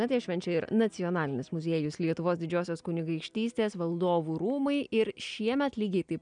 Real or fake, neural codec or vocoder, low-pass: real; none; 9.9 kHz